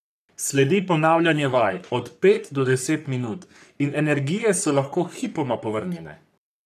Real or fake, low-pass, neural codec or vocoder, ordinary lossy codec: fake; 14.4 kHz; codec, 44.1 kHz, 3.4 kbps, Pupu-Codec; none